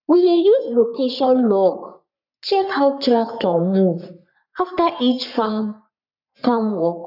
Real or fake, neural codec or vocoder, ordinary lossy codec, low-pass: fake; codec, 44.1 kHz, 3.4 kbps, Pupu-Codec; none; 5.4 kHz